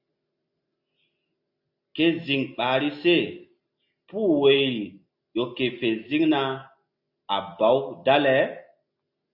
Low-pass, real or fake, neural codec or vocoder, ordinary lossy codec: 5.4 kHz; real; none; AAC, 48 kbps